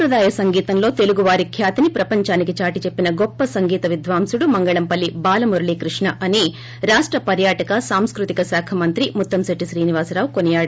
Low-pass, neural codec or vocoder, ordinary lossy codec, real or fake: none; none; none; real